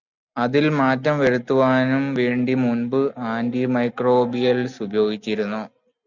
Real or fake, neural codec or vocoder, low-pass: real; none; 7.2 kHz